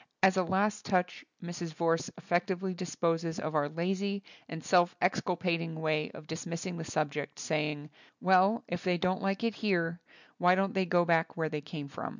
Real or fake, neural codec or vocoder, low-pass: real; none; 7.2 kHz